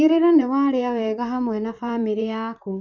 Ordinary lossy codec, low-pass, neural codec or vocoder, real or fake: none; 7.2 kHz; vocoder, 44.1 kHz, 128 mel bands, Pupu-Vocoder; fake